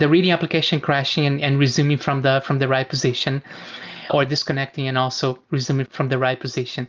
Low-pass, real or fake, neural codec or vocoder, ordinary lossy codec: 7.2 kHz; real; none; Opus, 32 kbps